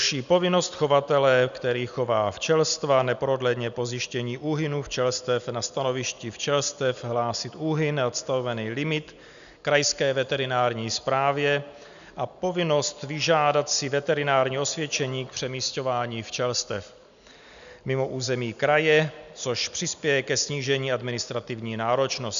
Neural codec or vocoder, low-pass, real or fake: none; 7.2 kHz; real